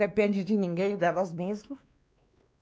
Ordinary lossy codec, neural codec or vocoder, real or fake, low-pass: none; codec, 16 kHz, 2 kbps, X-Codec, WavLM features, trained on Multilingual LibriSpeech; fake; none